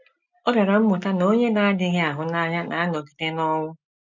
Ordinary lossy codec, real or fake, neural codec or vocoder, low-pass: MP3, 64 kbps; real; none; 7.2 kHz